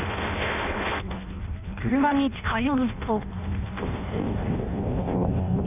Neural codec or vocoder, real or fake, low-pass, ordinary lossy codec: codec, 16 kHz in and 24 kHz out, 0.6 kbps, FireRedTTS-2 codec; fake; 3.6 kHz; none